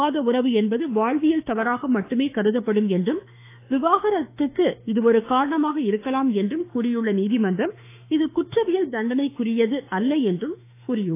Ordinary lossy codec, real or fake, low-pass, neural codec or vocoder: AAC, 24 kbps; fake; 3.6 kHz; autoencoder, 48 kHz, 32 numbers a frame, DAC-VAE, trained on Japanese speech